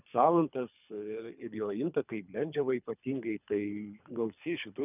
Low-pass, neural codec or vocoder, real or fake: 3.6 kHz; codec, 24 kHz, 3 kbps, HILCodec; fake